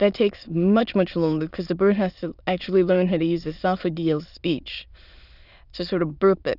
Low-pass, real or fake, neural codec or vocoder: 5.4 kHz; fake; autoencoder, 22.05 kHz, a latent of 192 numbers a frame, VITS, trained on many speakers